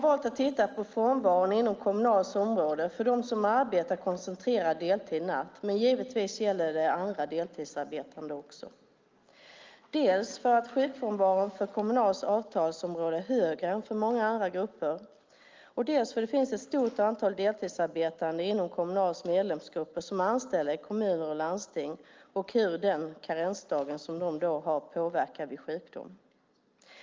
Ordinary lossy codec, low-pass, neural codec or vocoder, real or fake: Opus, 24 kbps; 7.2 kHz; none; real